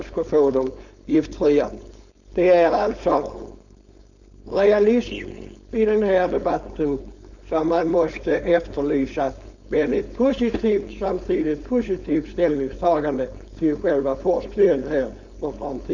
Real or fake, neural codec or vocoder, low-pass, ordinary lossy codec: fake; codec, 16 kHz, 4.8 kbps, FACodec; 7.2 kHz; none